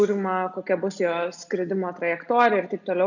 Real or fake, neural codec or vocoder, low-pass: real; none; 7.2 kHz